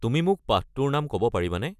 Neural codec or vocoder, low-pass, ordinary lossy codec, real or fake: none; 14.4 kHz; none; real